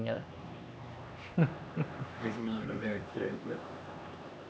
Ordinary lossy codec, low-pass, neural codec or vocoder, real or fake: none; none; codec, 16 kHz, 2 kbps, X-Codec, HuBERT features, trained on LibriSpeech; fake